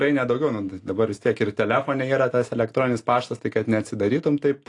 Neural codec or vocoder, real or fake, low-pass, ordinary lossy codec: none; real; 10.8 kHz; AAC, 48 kbps